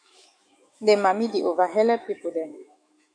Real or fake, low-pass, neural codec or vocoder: fake; 9.9 kHz; autoencoder, 48 kHz, 128 numbers a frame, DAC-VAE, trained on Japanese speech